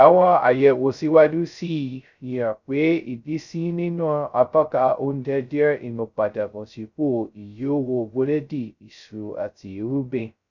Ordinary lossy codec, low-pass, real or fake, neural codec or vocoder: none; 7.2 kHz; fake; codec, 16 kHz, 0.2 kbps, FocalCodec